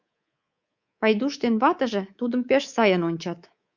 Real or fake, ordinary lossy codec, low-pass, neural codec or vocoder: fake; Opus, 64 kbps; 7.2 kHz; codec, 24 kHz, 3.1 kbps, DualCodec